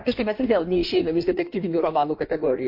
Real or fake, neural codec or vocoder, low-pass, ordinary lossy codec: fake; codec, 16 kHz in and 24 kHz out, 1.1 kbps, FireRedTTS-2 codec; 5.4 kHz; MP3, 32 kbps